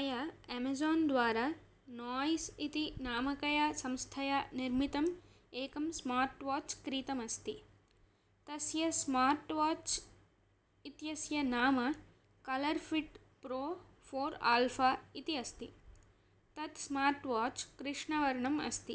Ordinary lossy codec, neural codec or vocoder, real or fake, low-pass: none; none; real; none